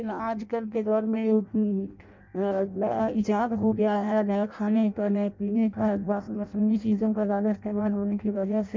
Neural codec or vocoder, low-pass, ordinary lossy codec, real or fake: codec, 16 kHz in and 24 kHz out, 0.6 kbps, FireRedTTS-2 codec; 7.2 kHz; MP3, 64 kbps; fake